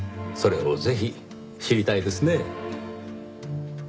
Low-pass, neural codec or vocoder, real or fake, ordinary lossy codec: none; none; real; none